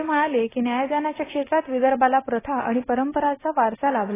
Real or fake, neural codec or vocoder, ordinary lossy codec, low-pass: real; none; AAC, 16 kbps; 3.6 kHz